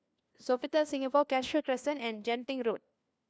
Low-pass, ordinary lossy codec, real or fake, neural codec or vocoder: none; none; fake; codec, 16 kHz, 1 kbps, FunCodec, trained on LibriTTS, 50 frames a second